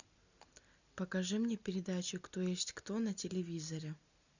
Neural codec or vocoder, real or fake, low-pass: none; real; 7.2 kHz